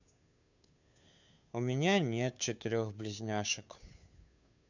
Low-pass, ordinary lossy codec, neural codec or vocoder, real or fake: 7.2 kHz; none; codec, 16 kHz, 2 kbps, FunCodec, trained on Chinese and English, 25 frames a second; fake